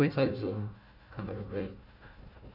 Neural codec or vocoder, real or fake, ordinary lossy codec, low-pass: codec, 16 kHz, 1 kbps, FunCodec, trained on Chinese and English, 50 frames a second; fake; none; 5.4 kHz